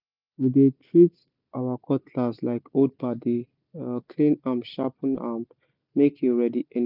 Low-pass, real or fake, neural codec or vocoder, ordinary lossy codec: 5.4 kHz; real; none; none